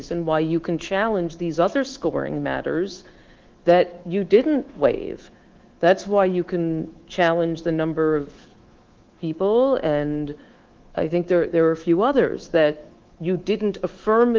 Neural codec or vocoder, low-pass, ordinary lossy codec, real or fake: codec, 24 kHz, 3.1 kbps, DualCodec; 7.2 kHz; Opus, 16 kbps; fake